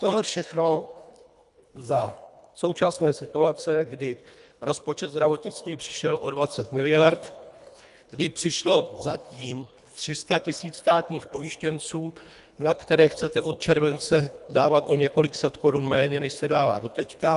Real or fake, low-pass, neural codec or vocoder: fake; 10.8 kHz; codec, 24 kHz, 1.5 kbps, HILCodec